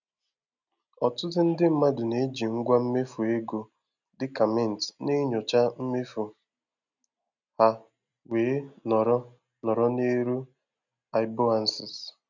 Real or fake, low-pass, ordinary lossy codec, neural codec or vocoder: real; 7.2 kHz; none; none